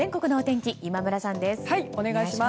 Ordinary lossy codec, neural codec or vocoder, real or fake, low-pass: none; none; real; none